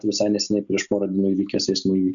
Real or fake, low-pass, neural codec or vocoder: real; 7.2 kHz; none